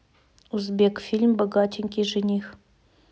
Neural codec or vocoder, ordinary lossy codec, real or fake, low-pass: none; none; real; none